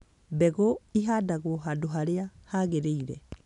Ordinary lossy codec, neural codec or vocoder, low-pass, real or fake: none; none; 10.8 kHz; real